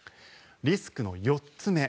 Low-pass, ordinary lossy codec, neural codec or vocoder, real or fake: none; none; none; real